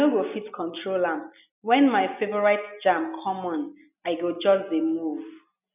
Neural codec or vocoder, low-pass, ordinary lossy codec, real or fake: none; 3.6 kHz; none; real